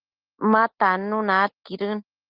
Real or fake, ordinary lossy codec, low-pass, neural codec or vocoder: real; Opus, 16 kbps; 5.4 kHz; none